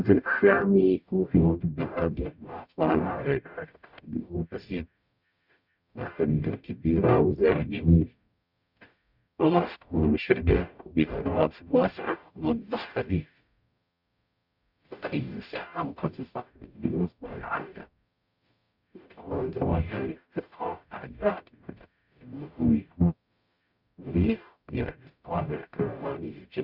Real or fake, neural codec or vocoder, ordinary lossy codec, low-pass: fake; codec, 44.1 kHz, 0.9 kbps, DAC; none; 5.4 kHz